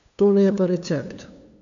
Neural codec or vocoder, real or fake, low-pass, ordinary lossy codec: codec, 16 kHz, 2 kbps, FunCodec, trained on LibriTTS, 25 frames a second; fake; 7.2 kHz; none